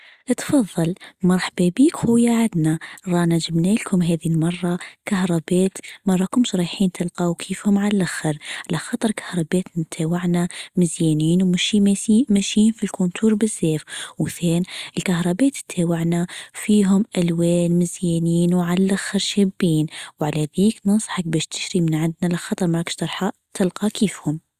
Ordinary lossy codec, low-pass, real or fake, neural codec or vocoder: Opus, 64 kbps; 14.4 kHz; real; none